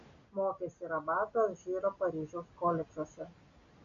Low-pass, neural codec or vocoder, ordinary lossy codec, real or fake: 7.2 kHz; none; AAC, 64 kbps; real